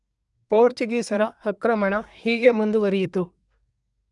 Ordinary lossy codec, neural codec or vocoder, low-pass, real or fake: none; codec, 24 kHz, 1 kbps, SNAC; 10.8 kHz; fake